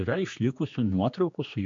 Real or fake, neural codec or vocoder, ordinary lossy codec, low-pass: fake; codec, 16 kHz, 2 kbps, X-Codec, HuBERT features, trained on general audio; MP3, 48 kbps; 7.2 kHz